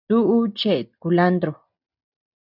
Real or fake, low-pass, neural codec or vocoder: real; 5.4 kHz; none